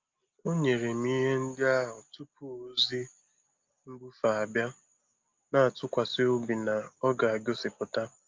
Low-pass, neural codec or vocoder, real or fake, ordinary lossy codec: 7.2 kHz; none; real; Opus, 32 kbps